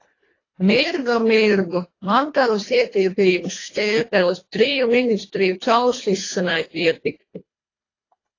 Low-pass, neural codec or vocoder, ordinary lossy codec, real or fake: 7.2 kHz; codec, 24 kHz, 1.5 kbps, HILCodec; AAC, 32 kbps; fake